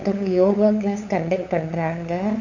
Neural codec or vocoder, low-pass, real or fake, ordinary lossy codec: codec, 16 kHz, 8 kbps, FunCodec, trained on LibriTTS, 25 frames a second; 7.2 kHz; fake; none